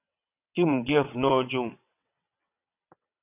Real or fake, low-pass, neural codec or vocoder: fake; 3.6 kHz; vocoder, 22.05 kHz, 80 mel bands, WaveNeXt